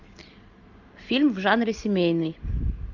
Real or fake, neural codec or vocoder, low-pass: real; none; 7.2 kHz